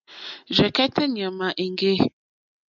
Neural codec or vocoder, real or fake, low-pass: none; real; 7.2 kHz